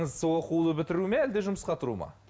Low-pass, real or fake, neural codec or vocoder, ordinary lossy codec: none; real; none; none